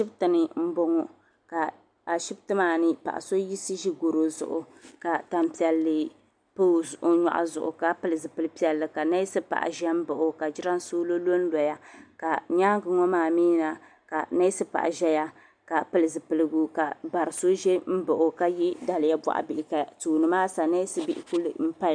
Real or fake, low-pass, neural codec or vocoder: real; 9.9 kHz; none